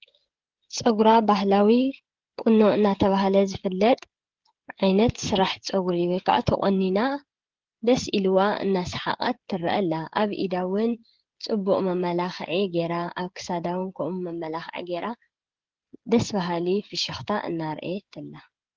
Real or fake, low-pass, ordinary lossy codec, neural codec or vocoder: fake; 7.2 kHz; Opus, 16 kbps; codec, 16 kHz, 16 kbps, FreqCodec, smaller model